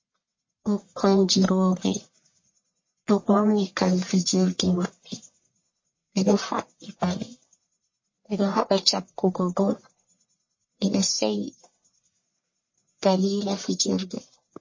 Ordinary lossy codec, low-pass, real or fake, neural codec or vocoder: MP3, 32 kbps; 7.2 kHz; fake; codec, 44.1 kHz, 1.7 kbps, Pupu-Codec